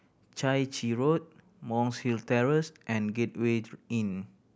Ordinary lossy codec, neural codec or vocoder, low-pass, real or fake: none; none; none; real